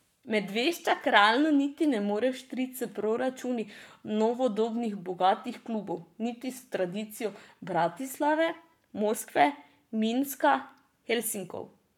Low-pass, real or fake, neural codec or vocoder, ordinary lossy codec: 19.8 kHz; fake; codec, 44.1 kHz, 7.8 kbps, Pupu-Codec; none